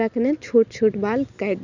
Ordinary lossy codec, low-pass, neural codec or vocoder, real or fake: none; 7.2 kHz; none; real